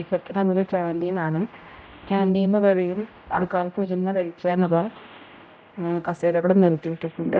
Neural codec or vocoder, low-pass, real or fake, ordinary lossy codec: codec, 16 kHz, 0.5 kbps, X-Codec, HuBERT features, trained on general audio; none; fake; none